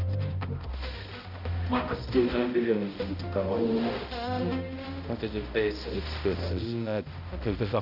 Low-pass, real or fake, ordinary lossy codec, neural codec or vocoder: 5.4 kHz; fake; none; codec, 16 kHz, 0.5 kbps, X-Codec, HuBERT features, trained on balanced general audio